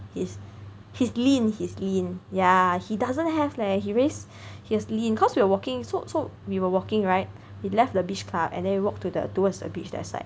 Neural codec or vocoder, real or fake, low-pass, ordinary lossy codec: none; real; none; none